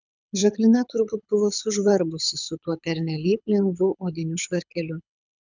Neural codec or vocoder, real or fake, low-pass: codec, 24 kHz, 6 kbps, HILCodec; fake; 7.2 kHz